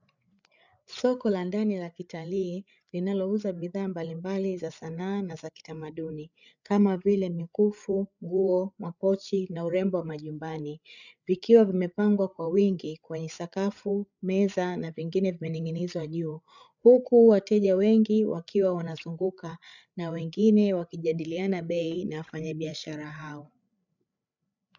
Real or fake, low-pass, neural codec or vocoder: fake; 7.2 kHz; codec, 16 kHz, 8 kbps, FreqCodec, larger model